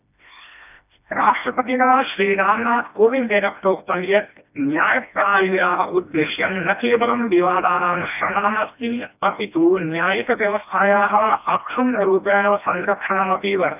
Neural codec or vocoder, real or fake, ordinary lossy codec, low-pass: codec, 16 kHz, 1 kbps, FreqCodec, smaller model; fake; none; 3.6 kHz